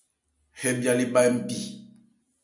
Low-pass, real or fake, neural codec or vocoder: 10.8 kHz; real; none